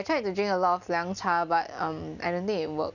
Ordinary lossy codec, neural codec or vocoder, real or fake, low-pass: none; none; real; 7.2 kHz